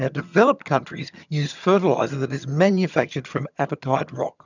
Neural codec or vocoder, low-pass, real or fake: vocoder, 22.05 kHz, 80 mel bands, HiFi-GAN; 7.2 kHz; fake